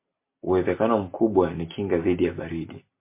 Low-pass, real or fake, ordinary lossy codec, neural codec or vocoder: 3.6 kHz; real; MP3, 24 kbps; none